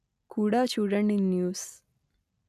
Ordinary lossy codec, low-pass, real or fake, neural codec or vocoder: none; 14.4 kHz; real; none